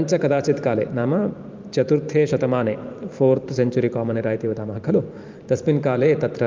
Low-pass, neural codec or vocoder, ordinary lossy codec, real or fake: 7.2 kHz; vocoder, 44.1 kHz, 128 mel bands every 512 samples, BigVGAN v2; Opus, 32 kbps; fake